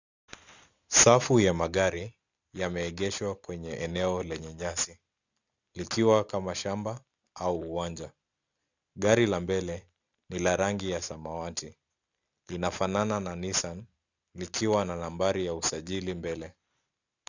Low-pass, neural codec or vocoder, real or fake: 7.2 kHz; none; real